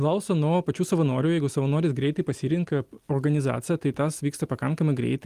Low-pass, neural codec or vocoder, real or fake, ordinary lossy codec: 14.4 kHz; none; real; Opus, 24 kbps